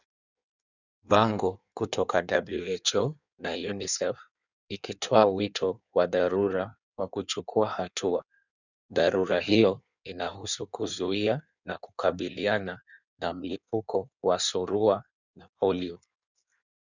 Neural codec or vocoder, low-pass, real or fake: codec, 16 kHz in and 24 kHz out, 1.1 kbps, FireRedTTS-2 codec; 7.2 kHz; fake